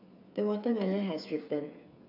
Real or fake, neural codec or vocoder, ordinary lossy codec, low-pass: fake; codec, 16 kHz, 8 kbps, FreqCodec, smaller model; none; 5.4 kHz